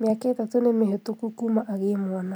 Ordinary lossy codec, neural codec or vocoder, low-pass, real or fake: none; vocoder, 44.1 kHz, 128 mel bands every 256 samples, BigVGAN v2; none; fake